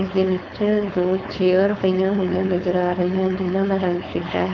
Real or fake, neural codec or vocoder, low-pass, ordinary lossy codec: fake; codec, 16 kHz, 4.8 kbps, FACodec; 7.2 kHz; none